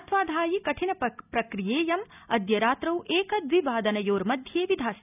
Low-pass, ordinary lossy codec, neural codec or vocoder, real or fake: 3.6 kHz; none; none; real